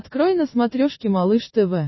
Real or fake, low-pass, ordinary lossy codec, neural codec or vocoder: real; 7.2 kHz; MP3, 24 kbps; none